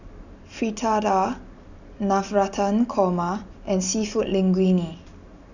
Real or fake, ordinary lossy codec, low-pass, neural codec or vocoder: real; none; 7.2 kHz; none